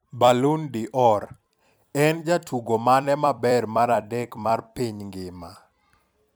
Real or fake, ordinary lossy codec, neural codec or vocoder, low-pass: fake; none; vocoder, 44.1 kHz, 128 mel bands every 256 samples, BigVGAN v2; none